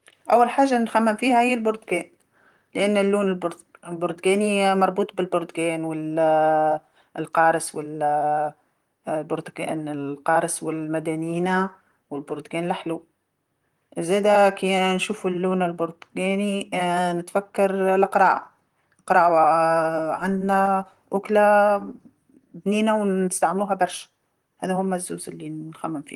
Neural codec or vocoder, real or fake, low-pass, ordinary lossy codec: vocoder, 44.1 kHz, 128 mel bands, Pupu-Vocoder; fake; 19.8 kHz; Opus, 24 kbps